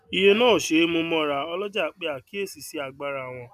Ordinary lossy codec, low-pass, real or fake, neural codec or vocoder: none; 14.4 kHz; real; none